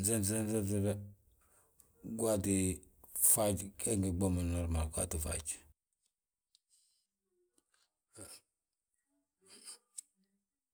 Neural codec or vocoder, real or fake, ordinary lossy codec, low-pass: none; real; none; none